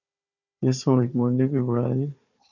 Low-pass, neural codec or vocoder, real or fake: 7.2 kHz; codec, 16 kHz, 4 kbps, FunCodec, trained on Chinese and English, 50 frames a second; fake